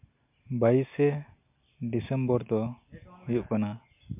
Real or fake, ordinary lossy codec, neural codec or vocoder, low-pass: real; MP3, 32 kbps; none; 3.6 kHz